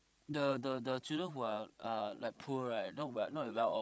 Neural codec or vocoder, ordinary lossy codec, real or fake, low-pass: codec, 16 kHz, 8 kbps, FreqCodec, larger model; none; fake; none